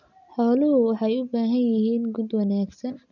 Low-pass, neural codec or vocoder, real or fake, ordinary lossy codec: 7.2 kHz; none; real; none